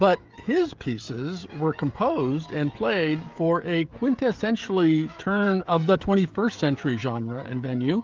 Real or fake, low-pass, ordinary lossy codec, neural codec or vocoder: fake; 7.2 kHz; Opus, 32 kbps; codec, 16 kHz, 16 kbps, FreqCodec, smaller model